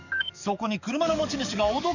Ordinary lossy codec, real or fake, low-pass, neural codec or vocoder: none; fake; 7.2 kHz; codec, 44.1 kHz, 7.8 kbps, DAC